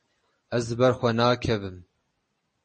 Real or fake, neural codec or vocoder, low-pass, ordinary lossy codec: real; none; 10.8 kHz; MP3, 32 kbps